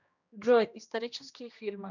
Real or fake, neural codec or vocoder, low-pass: fake; codec, 16 kHz, 1 kbps, X-Codec, HuBERT features, trained on general audio; 7.2 kHz